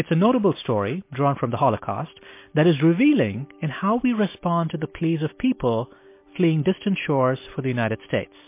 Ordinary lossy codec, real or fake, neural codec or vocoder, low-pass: MP3, 24 kbps; real; none; 3.6 kHz